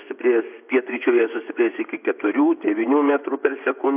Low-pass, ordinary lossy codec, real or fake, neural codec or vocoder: 3.6 kHz; AAC, 32 kbps; fake; vocoder, 24 kHz, 100 mel bands, Vocos